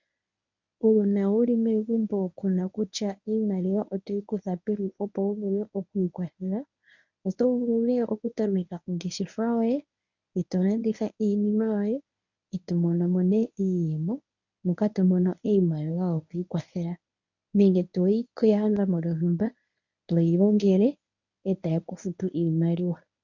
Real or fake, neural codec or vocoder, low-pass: fake; codec, 24 kHz, 0.9 kbps, WavTokenizer, medium speech release version 1; 7.2 kHz